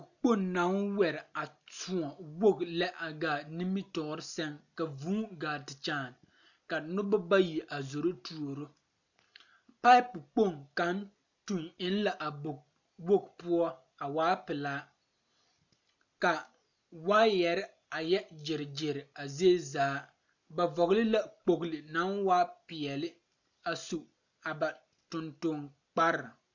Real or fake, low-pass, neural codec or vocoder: real; 7.2 kHz; none